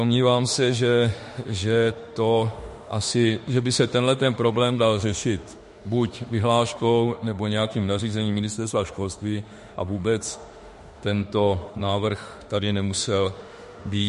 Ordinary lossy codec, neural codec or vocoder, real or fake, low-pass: MP3, 48 kbps; autoencoder, 48 kHz, 32 numbers a frame, DAC-VAE, trained on Japanese speech; fake; 14.4 kHz